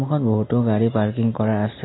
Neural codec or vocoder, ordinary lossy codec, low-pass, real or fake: none; AAC, 16 kbps; 7.2 kHz; real